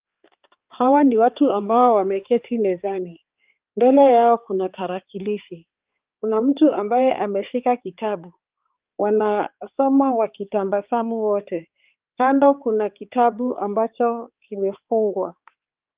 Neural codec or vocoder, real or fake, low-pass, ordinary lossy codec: codec, 16 kHz, 4 kbps, X-Codec, HuBERT features, trained on balanced general audio; fake; 3.6 kHz; Opus, 32 kbps